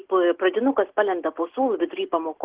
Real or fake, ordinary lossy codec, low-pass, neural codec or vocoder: real; Opus, 16 kbps; 3.6 kHz; none